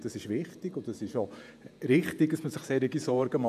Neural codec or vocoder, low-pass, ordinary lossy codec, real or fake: vocoder, 48 kHz, 128 mel bands, Vocos; 14.4 kHz; none; fake